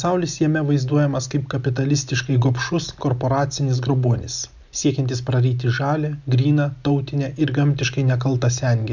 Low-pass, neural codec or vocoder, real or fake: 7.2 kHz; none; real